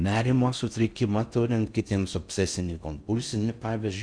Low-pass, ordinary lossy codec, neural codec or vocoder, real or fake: 9.9 kHz; Opus, 64 kbps; codec, 16 kHz in and 24 kHz out, 0.6 kbps, FocalCodec, streaming, 4096 codes; fake